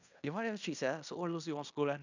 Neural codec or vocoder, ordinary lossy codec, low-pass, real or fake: codec, 16 kHz in and 24 kHz out, 0.9 kbps, LongCat-Audio-Codec, fine tuned four codebook decoder; none; 7.2 kHz; fake